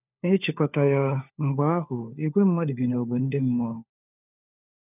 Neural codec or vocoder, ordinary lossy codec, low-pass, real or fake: codec, 16 kHz, 4 kbps, FunCodec, trained on LibriTTS, 50 frames a second; none; 3.6 kHz; fake